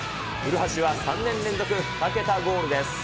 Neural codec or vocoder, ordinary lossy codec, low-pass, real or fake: none; none; none; real